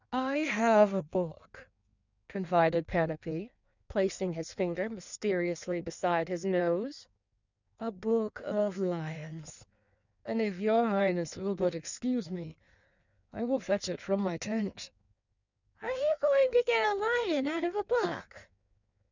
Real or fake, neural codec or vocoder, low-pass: fake; codec, 16 kHz in and 24 kHz out, 1.1 kbps, FireRedTTS-2 codec; 7.2 kHz